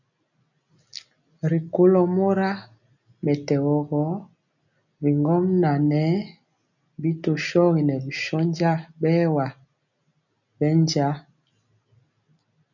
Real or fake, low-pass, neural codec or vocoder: real; 7.2 kHz; none